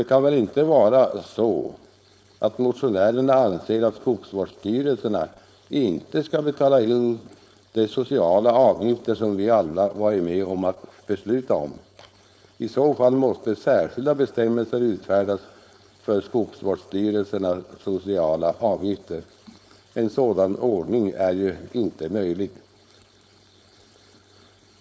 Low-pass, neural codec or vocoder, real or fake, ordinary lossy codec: none; codec, 16 kHz, 4.8 kbps, FACodec; fake; none